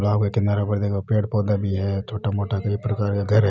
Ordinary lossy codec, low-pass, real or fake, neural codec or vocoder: none; none; real; none